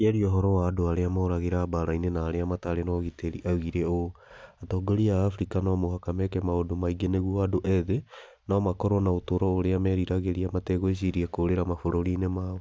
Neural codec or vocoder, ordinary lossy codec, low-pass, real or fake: none; none; none; real